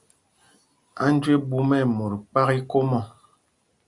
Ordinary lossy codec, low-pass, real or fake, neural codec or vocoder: Opus, 64 kbps; 10.8 kHz; real; none